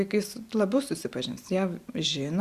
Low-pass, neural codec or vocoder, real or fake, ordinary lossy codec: 14.4 kHz; none; real; Opus, 64 kbps